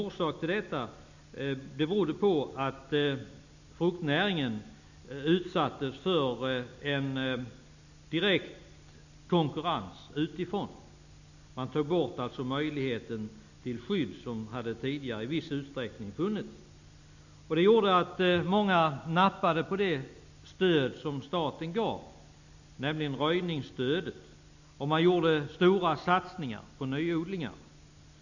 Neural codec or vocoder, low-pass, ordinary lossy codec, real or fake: none; 7.2 kHz; none; real